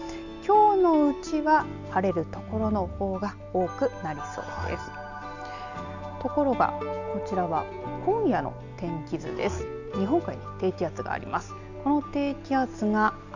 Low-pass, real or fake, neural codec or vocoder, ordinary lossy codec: 7.2 kHz; real; none; none